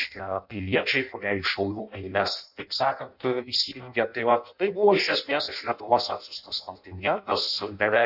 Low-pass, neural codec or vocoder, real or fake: 5.4 kHz; codec, 16 kHz in and 24 kHz out, 0.6 kbps, FireRedTTS-2 codec; fake